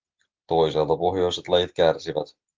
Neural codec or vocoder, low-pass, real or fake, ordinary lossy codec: none; 7.2 kHz; real; Opus, 16 kbps